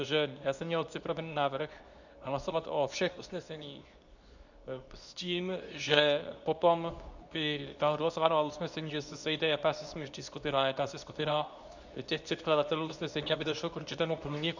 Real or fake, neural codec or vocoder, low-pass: fake; codec, 24 kHz, 0.9 kbps, WavTokenizer, medium speech release version 2; 7.2 kHz